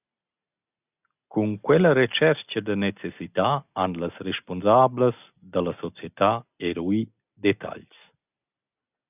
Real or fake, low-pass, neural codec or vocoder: real; 3.6 kHz; none